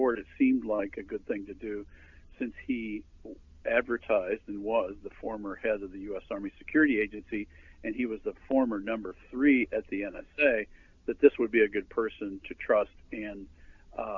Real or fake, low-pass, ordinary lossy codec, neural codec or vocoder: real; 7.2 kHz; MP3, 64 kbps; none